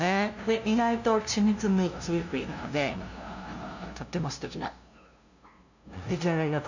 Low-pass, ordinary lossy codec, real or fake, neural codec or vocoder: 7.2 kHz; none; fake; codec, 16 kHz, 0.5 kbps, FunCodec, trained on LibriTTS, 25 frames a second